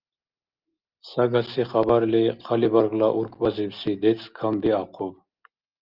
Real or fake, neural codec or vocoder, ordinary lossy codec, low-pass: real; none; Opus, 24 kbps; 5.4 kHz